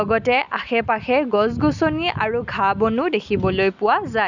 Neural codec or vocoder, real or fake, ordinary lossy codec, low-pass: none; real; none; 7.2 kHz